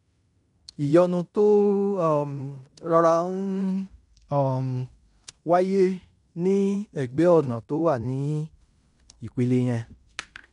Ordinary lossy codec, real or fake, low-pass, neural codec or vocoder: none; fake; 10.8 kHz; codec, 16 kHz in and 24 kHz out, 0.9 kbps, LongCat-Audio-Codec, fine tuned four codebook decoder